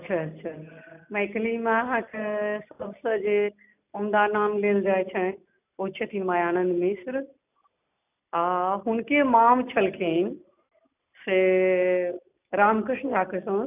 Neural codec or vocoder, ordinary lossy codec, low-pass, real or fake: none; none; 3.6 kHz; real